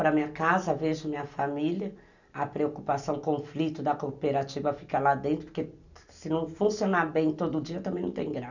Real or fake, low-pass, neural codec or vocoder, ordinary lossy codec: real; 7.2 kHz; none; none